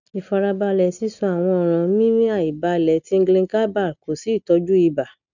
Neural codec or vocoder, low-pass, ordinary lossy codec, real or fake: none; 7.2 kHz; none; real